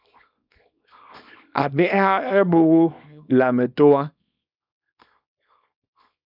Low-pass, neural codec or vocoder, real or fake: 5.4 kHz; codec, 24 kHz, 0.9 kbps, WavTokenizer, small release; fake